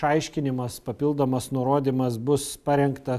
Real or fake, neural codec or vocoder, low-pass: real; none; 14.4 kHz